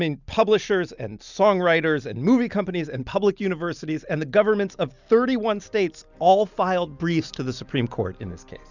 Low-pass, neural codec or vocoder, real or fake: 7.2 kHz; none; real